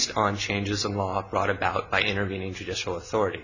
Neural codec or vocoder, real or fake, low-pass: none; real; 7.2 kHz